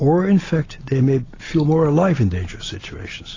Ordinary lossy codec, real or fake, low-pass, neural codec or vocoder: AAC, 32 kbps; real; 7.2 kHz; none